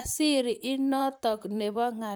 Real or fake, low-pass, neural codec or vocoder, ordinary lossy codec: fake; none; vocoder, 44.1 kHz, 128 mel bands, Pupu-Vocoder; none